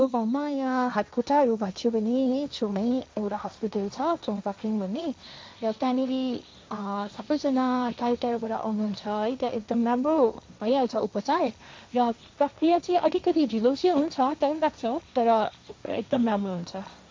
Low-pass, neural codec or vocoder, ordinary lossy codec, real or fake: none; codec, 16 kHz, 1.1 kbps, Voila-Tokenizer; none; fake